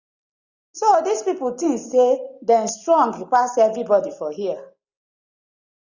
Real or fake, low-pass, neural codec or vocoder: real; 7.2 kHz; none